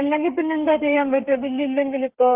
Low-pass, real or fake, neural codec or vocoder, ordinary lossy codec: 3.6 kHz; fake; codec, 32 kHz, 1.9 kbps, SNAC; Opus, 32 kbps